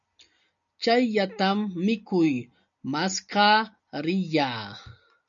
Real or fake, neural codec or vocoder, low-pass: real; none; 7.2 kHz